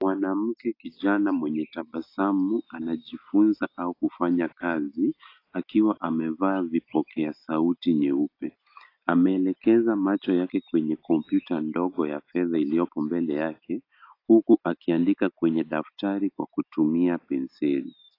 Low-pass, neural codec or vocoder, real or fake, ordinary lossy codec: 5.4 kHz; none; real; AAC, 32 kbps